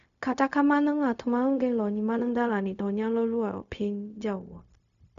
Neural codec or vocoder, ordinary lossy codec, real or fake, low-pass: codec, 16 kHz, 0.4 kbps, LongCat-Audio-Codec; none; fake; 7.2 kHz